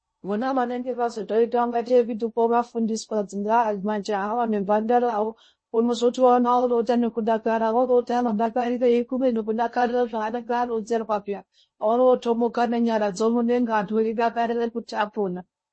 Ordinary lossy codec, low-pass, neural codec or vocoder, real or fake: MP3, 32 kbps; 9.9 kHz; codec, 16 kHz in and 24 kHz out, 0.6 kbps, FocalCodec, streaming, 2048 codes; fake